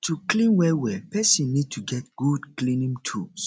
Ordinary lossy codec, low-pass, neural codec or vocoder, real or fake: none; none; none; real